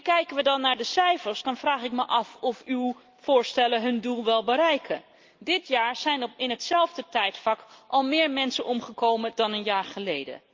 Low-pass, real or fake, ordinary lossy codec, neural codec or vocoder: 7.2 kHz; real; Opus, 32 kbps; none